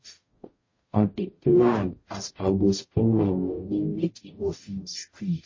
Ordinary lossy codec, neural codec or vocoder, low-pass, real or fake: MP3, 32 kbps; codec, 44.1 kHz, 0.9 kbps, DAC; 7.2 kHz; fake